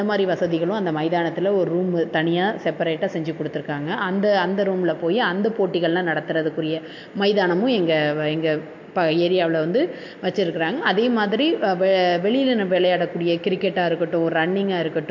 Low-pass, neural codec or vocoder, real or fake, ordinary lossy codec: 7.2 kHz; none; real; MP3, 48 kbps